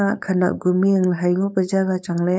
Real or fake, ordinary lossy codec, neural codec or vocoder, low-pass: fake; none; codec, 16 kHz, 4.8 kbps, FACodec; none